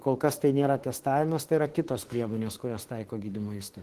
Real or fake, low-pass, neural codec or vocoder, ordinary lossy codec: fake; 14.4 kHz; autoencoder, 48 kHz, 32 numbers a frame, DAC-VAE, trained on Japanese speech; Opus, 16 kbps